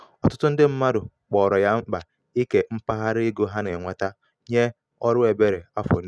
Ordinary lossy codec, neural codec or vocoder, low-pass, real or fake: none; none; none; real